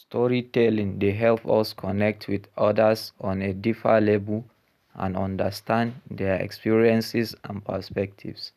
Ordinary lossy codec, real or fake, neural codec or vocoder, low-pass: none; real; none; 14.4 kHz